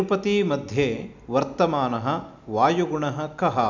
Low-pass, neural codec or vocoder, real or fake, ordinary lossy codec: 7.2 kHz; none; real; none